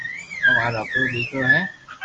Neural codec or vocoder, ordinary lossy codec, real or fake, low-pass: none; Opus, 24 kbps; real; 7.2 kHz